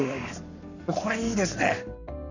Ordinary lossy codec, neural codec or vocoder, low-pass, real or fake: none; codec, 44.1 kHz, 7.8 kbps, Pupu-Codec; 7.2 kHz; fake